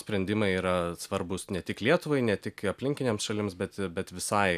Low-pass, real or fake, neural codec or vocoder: 14.4 kHz; real; none